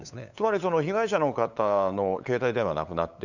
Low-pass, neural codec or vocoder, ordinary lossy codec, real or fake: 7.2 kHz; codec, 16 kHz, 8 kbps, FunCodec, trained on LibriTTS, 25 frames a second; none; fake